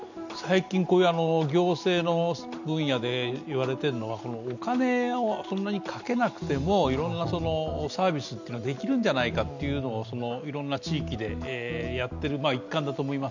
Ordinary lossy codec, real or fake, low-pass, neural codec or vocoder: none; real; 7.2 kHz; none